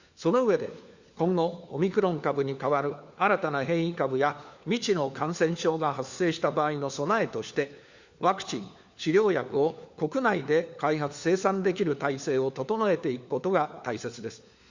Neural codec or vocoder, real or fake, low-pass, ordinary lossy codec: codec, 16 kHz, 4 kbps, FunCodec, trained on LibriTTS, 50 frames a second; fake; 7.2 kHz; Opus, 64 kbps